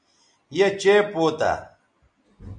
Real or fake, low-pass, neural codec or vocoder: real; 9.9 kHz; none